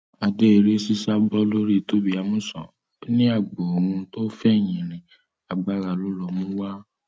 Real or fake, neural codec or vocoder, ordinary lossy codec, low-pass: real; none; none; none